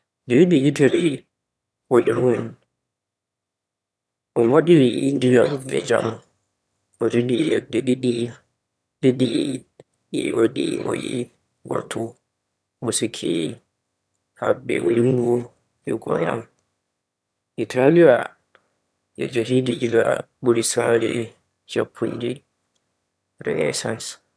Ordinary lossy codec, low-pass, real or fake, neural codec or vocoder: none; none; fake; autoencoder, 22.05 kHz, a latent of 192 numbers a frame, VITS, trained on one speaker